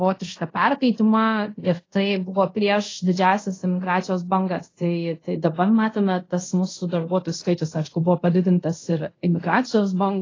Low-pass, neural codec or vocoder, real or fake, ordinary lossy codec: 7.2 kHz; codec, 24 kHz, 0.5 kbps, DualCodec; fake; AAC, 32 kbps